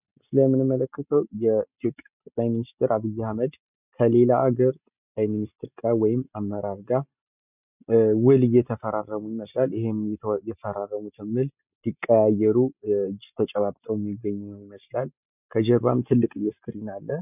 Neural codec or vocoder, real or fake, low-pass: none; real; 3.6 kHz